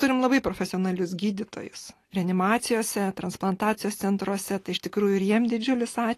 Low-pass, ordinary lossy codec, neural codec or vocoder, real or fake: 14.4 kHz; AAC, 48 kbps; none; real